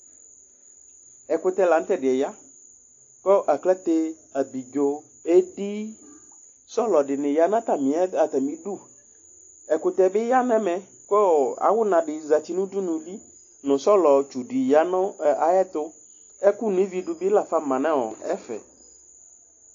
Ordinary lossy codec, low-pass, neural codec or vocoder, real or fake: AAC, 48 kbps; 7.2 kHz; none; real